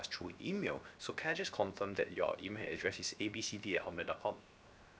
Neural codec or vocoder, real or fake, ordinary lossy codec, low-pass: codec, 16 kHz, 0.7 kbps, FocalCodec; fake; none; none